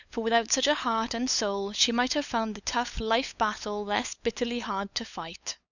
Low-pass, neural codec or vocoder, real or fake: 7.2 kHz; codec, 16 kHz, 8 kbps, FunCodec, trained on LibriTTS, 25 frames a second; fake